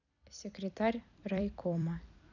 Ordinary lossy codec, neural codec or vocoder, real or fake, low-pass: none; vocoder, 44.1 kHz, 128 mel bands every 256 samples, BigVGAN v2; fake; 7.2 kHz